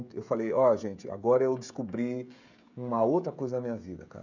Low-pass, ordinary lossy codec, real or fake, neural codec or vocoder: 7.2 kHz; none; fake; codec, 16 kHz, 16 kbps, FreqCodec, smaller model